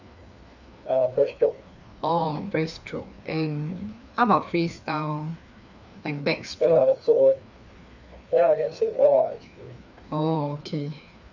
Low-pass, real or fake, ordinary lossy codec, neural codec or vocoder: 7.2 kHz; fake; none; codec, 16 kHz, 2 kbps, FreqCodec, larger model